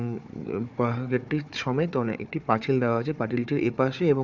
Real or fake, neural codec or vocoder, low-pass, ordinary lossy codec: fake; codec, 16 kHz, 4 kbps, FunCodec, trained on Chinese and English, 50 frames a second; 7.2 kHz; none